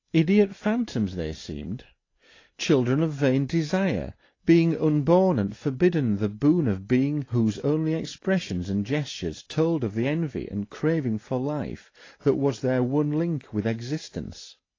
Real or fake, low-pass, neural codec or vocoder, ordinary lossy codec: real; 7.2 kHz; none; AAC, 32 kbps